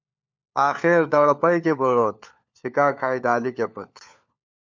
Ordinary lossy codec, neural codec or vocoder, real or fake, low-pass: MP3, 64 kbps; codec, 16 kHz, 4 kbps, FunCodec, trained on LibriTTS, 50 frames a second; fake; 7.2 kHz